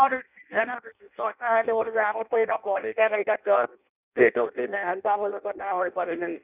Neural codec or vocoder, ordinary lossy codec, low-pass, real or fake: codec, 16 kHz in and 24 kHz out, 0.6 kbps, FireRedTTS-2 codec; none; 3.6 kHz; fake